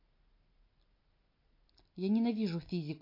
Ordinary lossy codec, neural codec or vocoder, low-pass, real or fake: MP3, 32 kbps; none; 5.4 kHz; real